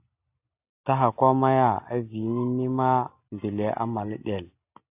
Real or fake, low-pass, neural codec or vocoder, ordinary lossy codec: real; 3.6 kHz; none; AAC, 32 kbps